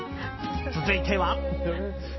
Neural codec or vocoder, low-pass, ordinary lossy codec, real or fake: none; 7.2 kHz; MP3, 24 kbps; real